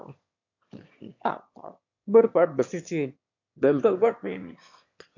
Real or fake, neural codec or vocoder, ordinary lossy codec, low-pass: fake; autoencoder, 22.05 kHz, a latent of 192 numbers a frame, VITS, trained on one speaker; MP3, 48 kbps; 7.2 kHz